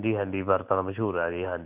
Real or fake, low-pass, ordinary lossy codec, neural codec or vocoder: real; 3.6 kHz; none; none